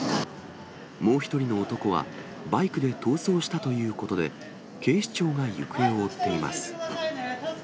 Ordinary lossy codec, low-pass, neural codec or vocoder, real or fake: none; none; none; real